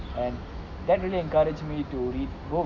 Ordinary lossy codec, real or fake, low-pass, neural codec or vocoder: none; real; 7.2 kHz; none